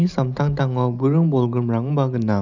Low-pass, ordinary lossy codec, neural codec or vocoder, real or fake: 7.2 kHz; none; none; real